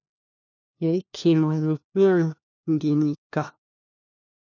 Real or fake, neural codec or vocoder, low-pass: fake; codec, 16 kHz, 1 kbps, FunCodec, trained on LibriTTS, 50 frames a second; 7.2 kHz